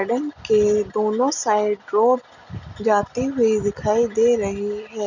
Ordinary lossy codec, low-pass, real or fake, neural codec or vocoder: none; 7.2 kHz; real; none